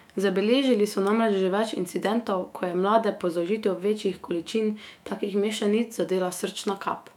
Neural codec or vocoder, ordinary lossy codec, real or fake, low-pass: autoencoder, 48 kHz, 128 numbers a frame, DAC-VAE, trained on Japanese speech; none; fake; 19.8 kHz